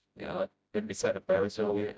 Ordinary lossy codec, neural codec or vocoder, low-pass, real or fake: none; codec, 16 kHz, 0.5 kbps, FreqCodec, smaller model; none; fake